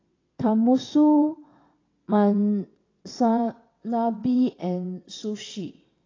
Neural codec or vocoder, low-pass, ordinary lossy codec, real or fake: vocoder, 22.05 kHz, 80 mel bands, WaveNeXt; 7.2 kHz; AAC, 32 kbps; fake